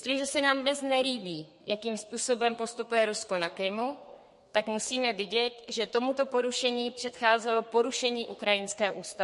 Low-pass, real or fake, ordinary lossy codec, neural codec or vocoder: 14.4 kHz; fake; MP3, 48 kbps; codec, 32 kHz, 1.9 kbps, SNAC